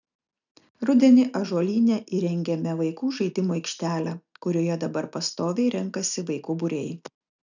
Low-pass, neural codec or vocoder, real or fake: 7.2 kHz; none; real